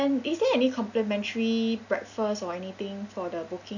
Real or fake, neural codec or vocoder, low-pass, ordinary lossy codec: real; none; 7.2 kHz; none